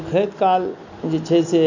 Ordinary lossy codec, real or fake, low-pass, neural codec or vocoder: none; real; 7.2 kHz; none